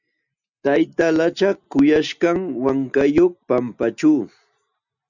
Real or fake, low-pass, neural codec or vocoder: real; 7.2 kHz; none